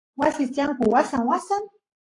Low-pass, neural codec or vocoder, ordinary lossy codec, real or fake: 10.8 kHz; codec, 44.1 kHz, 7.8 kbps, Pupu-Codec; AAC, 48 kbps; fake